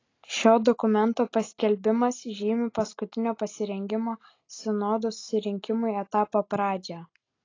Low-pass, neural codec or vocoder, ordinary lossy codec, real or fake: 7.2 kHz; none; AAC, 32 kbps; real